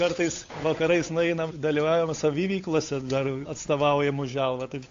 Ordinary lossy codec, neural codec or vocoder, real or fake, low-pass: AAC, 48 kbps; codec, 16 kHz, 8 kbps, FunCodec, trained on Chinese and English, 25 frames a second; fake; 7.2 kHz